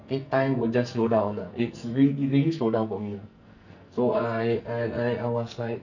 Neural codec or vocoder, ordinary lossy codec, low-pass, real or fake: codec, 44.1 kHz, 2.6 kbps, SNAC; none; 7.2 kHz; fake